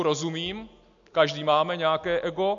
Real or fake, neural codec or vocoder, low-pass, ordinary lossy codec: real; none; 7.2 kHz; MP3, 48 kbps